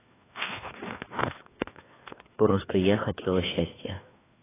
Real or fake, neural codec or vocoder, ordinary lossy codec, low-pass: fake; codec, 16 kHz, 2 kbps, FreqCodec, larger model; AAC, 16 kbps; 3.6 kHz